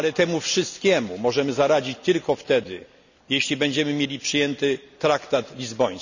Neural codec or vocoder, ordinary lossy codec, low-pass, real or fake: none; none; 7.2 kHz; real